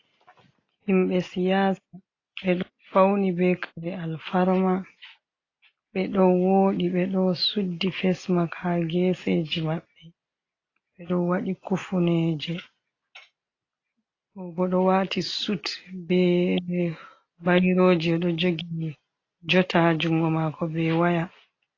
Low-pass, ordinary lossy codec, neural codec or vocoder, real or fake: 7.2 kHz; AAC, 32 kbps; none; real